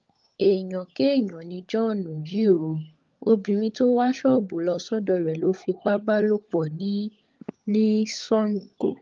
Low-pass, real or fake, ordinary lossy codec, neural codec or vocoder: 7.2 kHz; fake; Opus, 32 kbps; codec, 16 kHz, 16 kbps, FunCodec, trained on LibriTTS, 50 frames a second